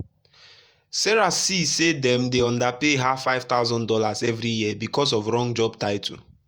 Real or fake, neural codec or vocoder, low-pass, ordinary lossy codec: fake; vocoder, 48 kHz, 128 mel bands, Vocos; 19.8 kHz; Opus, 64 kbps